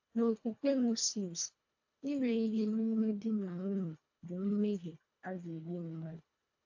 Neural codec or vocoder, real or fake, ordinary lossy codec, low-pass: codec, 24 kHz, 1.5 kbps, HILCodec; fake; AAC, 48 kbps; 7.2 kHz